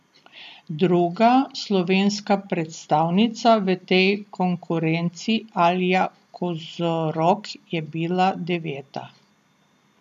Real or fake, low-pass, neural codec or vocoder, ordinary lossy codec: real; 14.4 kHz; none; none